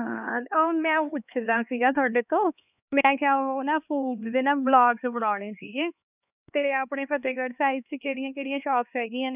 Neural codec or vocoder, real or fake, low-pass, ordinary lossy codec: codec, 16 kHz, 2 kbps, X-Codec, HuBERT features, trained on LibriSpeech; fake; 3.6 kHz; none